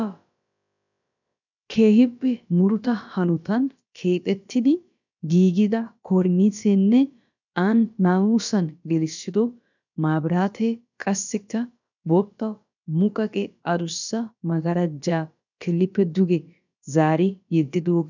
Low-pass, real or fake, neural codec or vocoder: 7.2 kHz; fake; codec, 16 kHz, about 1 kbps, DyCAST, with the encoder's durations